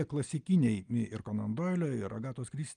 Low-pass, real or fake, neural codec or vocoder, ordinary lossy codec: 9.9 kHz; real; none; Opus, 32 kbps